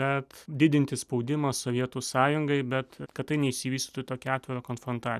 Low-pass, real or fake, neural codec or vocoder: 14.4 kHz; real; none